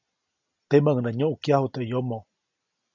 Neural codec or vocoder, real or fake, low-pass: none; real; 7.2 kHz